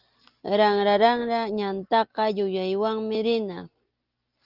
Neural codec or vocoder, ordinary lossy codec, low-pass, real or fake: none; Opus, 32 kbps; 5.4 kHz; real